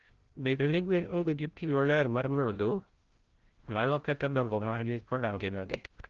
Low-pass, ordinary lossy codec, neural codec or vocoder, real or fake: 7.2 kHz; Opus, 16 kbps; codec, 16 kHz, 0.5 kbps, FreqCodec, larger model; fake